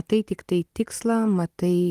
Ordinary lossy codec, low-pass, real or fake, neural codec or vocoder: Opus, 32 kbps; 14.4 kHz; real; none